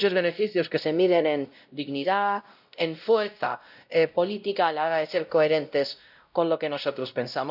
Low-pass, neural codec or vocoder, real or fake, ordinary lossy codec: 5.4 kHz; codec, 16 kHz, 0.5 kbps, X-Codec, WavLM features, trained on Multilingual LibriSpeech; fake; none